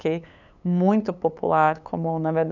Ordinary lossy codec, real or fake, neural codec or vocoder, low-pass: none; fake; codec, 16 kHz, 8 kbps, FunCodec, trained on LibriTTS, 25 frames a second; 7.2 kHz